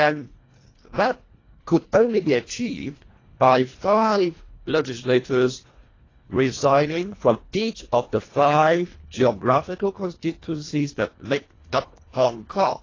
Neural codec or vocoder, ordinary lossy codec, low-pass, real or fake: codec, 24 kHz, 1.5 kbps, HILCodec; AAC, 32 kbps; 7.2 kHz; fake